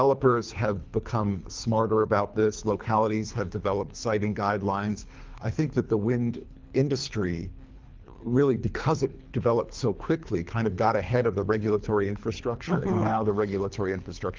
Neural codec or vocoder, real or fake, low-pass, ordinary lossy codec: codec, 24 kHz, 3 kbps, HILCodec; fake; 7.2 kHz; Opus, 32 kbps